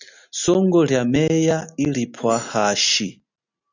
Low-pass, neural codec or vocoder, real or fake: 7.2 kHz; none; real